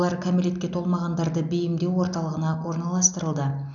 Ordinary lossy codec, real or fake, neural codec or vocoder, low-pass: none; real; none; 7.2 kHz